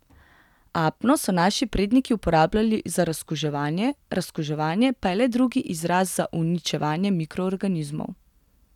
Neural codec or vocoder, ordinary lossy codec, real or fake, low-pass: none; none; real; 19.8 kHz